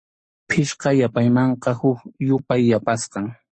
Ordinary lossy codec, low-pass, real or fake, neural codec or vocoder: MP3, 32 kbps; 10.8 kHz; fake; codec, 44.1 kHz, 7.8 kbps, Pupu-Codec